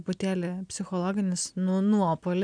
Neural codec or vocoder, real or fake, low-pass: none; real; 9.9 kHz